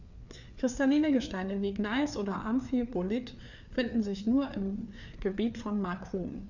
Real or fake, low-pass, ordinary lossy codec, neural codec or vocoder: fake; 7.2 kHz; none; codec, 16 kHz, 4 kbps, FreqCodec, larger model